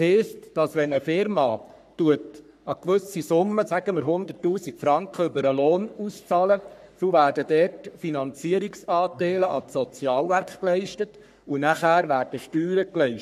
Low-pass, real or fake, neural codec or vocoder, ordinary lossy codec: 14.4 kHz; fake; codec, 44.1 kHz, 3.4 kbps, Pupu-Codec; none